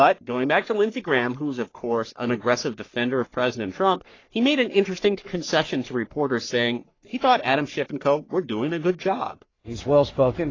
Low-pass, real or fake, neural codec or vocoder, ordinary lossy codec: 7.2 kHz; fake; codec, 44.1 kHz, 3.4 kbps, Pupu-Codec; AAC, 32 kbps